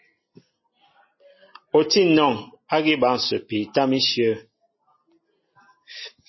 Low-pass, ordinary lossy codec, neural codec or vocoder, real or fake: 7.2 kHz; MP3, 24 kbps; none; real